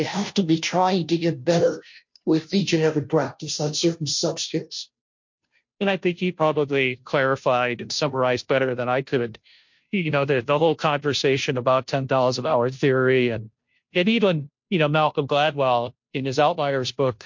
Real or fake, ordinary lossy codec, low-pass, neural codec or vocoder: fake; MP3, 48 kbps; 7.2 kHz; codec, 16 kHz, 0.5 kbps, FunCodec, trained on Chinese and English, 25 frames a second